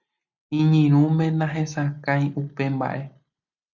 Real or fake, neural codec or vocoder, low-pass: real; none; 7.2 kHz